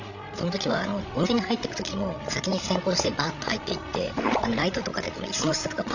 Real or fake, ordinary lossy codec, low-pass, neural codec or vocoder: fake; none; 7.2 kHz; codec, 16 kHz, 16 kbps, FreqCodec, larger model